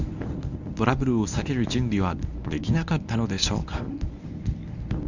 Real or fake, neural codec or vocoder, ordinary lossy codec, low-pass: fake; codec, 24 kHz, 0.9 kbps, WavTokenizer, medium speech release version 1; none; 7.2 kHz